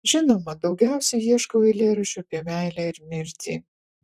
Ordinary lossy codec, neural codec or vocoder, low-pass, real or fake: AAC, 96 kbps; vocoder, 44.1 kHz, 128 mel bands, Pupu-Vocoder; 14.4 kHz; fake